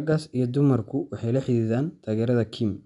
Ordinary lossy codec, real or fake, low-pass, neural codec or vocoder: none; real; 10.8 kHz; none